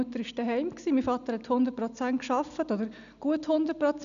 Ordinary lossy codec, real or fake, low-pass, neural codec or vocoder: none; real; 7.2 kHz; none